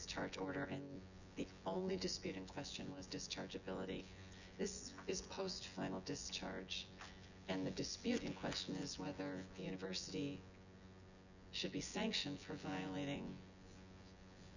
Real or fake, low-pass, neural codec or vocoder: fake; 7.2 kHz; vocoder, 24 kHz, 100 mel bands, Vocos